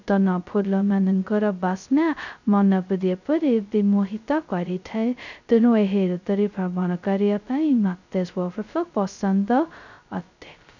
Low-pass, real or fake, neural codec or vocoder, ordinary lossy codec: 7.2 kHz; fake; codec, 16 kHz, 0.2 kbps, FocalCodec; none